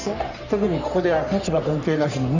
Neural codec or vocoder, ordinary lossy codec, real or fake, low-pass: codec, 44.1 kHz, 3.4 kbps, Pupu-Codec; none; fake; 7.2 kHz